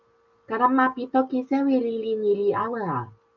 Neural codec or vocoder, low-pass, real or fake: none; 7.2 kHz; real